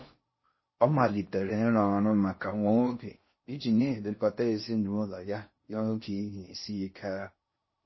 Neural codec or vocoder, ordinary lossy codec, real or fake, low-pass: codec, 16 kHz in and 24 kHz out, 0.6 kbps, FocalCodec, streaming, 4096 codes; MP3, 24 kbps; fake; 7.2 kHz